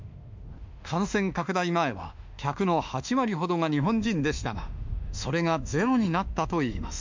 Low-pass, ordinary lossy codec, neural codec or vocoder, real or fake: 7.2 kHz; MP3, 64 kbps; autoencoder, 48 kHz, 32 numbers a frame, DAC-VAE, trained on Japanese speech; fake